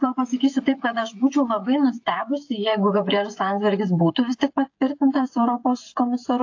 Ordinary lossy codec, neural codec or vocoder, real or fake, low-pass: AAC, 48 kbps; codec, 16 kHz, 16 kbps, FreqCodec, smaller model; fake; 7.2 kHz